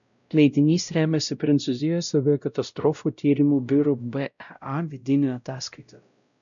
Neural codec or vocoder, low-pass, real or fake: codec, 16 kHz, 0.5 kbps, X-Codec, WavLM features, trained on Multilingual LibriSpeech; 7.2 kHz; fake